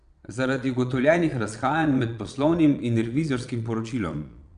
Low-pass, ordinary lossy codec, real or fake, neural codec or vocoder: 9.9 kHz; none; fake; vocoder, 22.05 kHz, 80 mel bands, Vocos